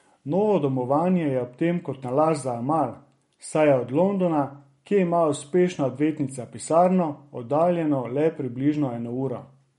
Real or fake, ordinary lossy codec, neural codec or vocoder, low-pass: real; MP3, 48 kbps; none; 19.8 kHz